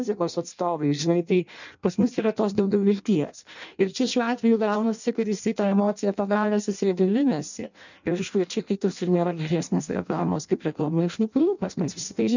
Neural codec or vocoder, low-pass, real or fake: codec, 16 kHz in and 24 kHz out, 0.6 kbps, FireRedTTS-2 codec; 7.2 kHz; fake